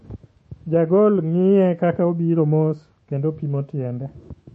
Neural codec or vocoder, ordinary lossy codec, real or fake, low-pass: autoencoder, 48 kHz, 128 numbers a frame, DAC-VAE, trained on Japanese speech; MP3, 32 kbps; fake; 10.8 kHz